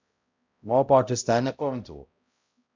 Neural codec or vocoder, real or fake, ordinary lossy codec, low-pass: codec, 16 kHz, 0.5 kbps, X-Codec, HuBERT features, trained on balanced general audio; fake; MP3, 64 kbps; 7.2 kHz